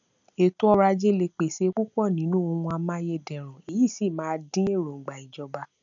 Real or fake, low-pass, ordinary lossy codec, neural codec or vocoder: real; 7.2 kHz; none; none